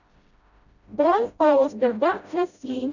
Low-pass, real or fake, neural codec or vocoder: 7.2 kHz; fake; codec, 16 kHz, 0.5 kbps, FreqCodec, smaller model